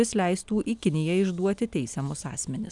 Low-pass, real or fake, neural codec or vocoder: 10.8 kHz; real; none